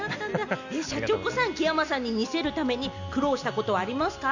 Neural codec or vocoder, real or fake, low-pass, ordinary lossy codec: none; real; 7.2 kHz; none